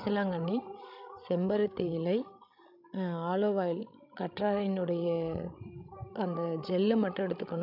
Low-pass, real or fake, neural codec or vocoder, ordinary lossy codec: 5.4 kHz; fake; codec, 16 kHz, 16 kbps, FreqCodec, larger model; none